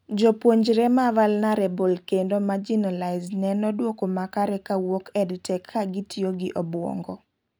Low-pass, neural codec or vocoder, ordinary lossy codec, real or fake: none; none; none; real